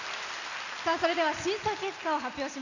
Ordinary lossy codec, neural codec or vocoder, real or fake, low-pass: none; none; real; 7.2 kHz